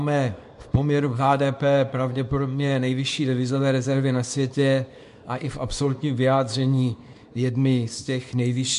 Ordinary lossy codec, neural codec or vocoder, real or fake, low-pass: MP3, 64 kbps; codec, 24 kHz, 0.9 kbps, WavTokenizer, small release; fake; 10.8 kHz